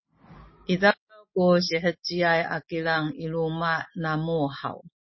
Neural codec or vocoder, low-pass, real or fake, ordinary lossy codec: none; 7.2 kHz; real; MP3, 24 kbps